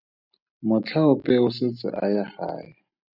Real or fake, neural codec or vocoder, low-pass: real; none; 5.4 kHz